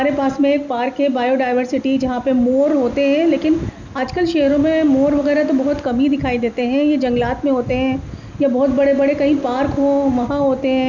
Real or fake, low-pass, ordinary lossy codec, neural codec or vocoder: real; 7.2 kHz; none; none